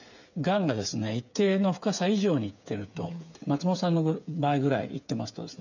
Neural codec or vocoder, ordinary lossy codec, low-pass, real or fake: codec, 16 kHz, 8 kbps, FreqCodec, smaller model; none; 7.2 kHz; fake